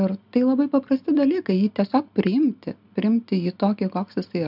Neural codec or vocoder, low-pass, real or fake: none; 5.4 kHz; real